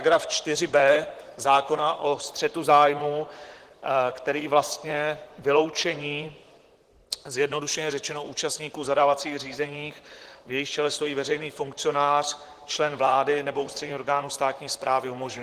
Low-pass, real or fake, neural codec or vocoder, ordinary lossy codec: 14.4 kHz; fake; vocoder, 44.1 kHz, 128 mel bands, Pupu-Vocoder; Opus, 16 kbps